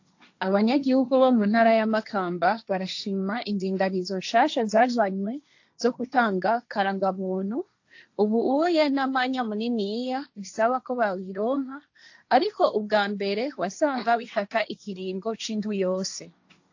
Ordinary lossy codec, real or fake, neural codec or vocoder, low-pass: AAC, 48 kbps; fake; codec, 16 kHz, 1.1 kbps, Voila-Tokenizer; 7.2 kHz